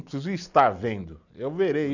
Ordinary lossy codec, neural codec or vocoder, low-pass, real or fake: none; none; 7.2 kHz; real